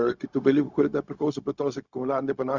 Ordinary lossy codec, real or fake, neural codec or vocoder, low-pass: Opus, 64 kbps; fake; codec, 16 kHz, 0.4 kbps, LongCat-Audio-Codec; 7.2 kHz